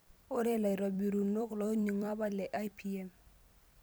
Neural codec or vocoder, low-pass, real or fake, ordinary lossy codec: vocoder, 44.1 kHz, 128 mel bands every 256 samples, BigVGAN v2; none; fake; none